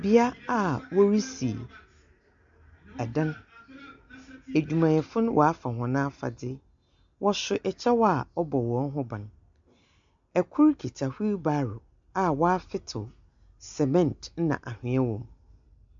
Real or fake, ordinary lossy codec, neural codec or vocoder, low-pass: real; MP3, 64 kbps; none; 7.2 kHz